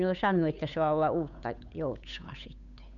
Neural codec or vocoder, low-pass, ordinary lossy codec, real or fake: codec, 16 kHz, 8 kbps, FunCodec, trained on Chinese and English, 25 frames a second; 7.2 kHz; none; fake